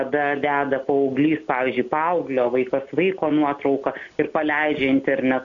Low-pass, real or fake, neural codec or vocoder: 7.2 kHz; real; none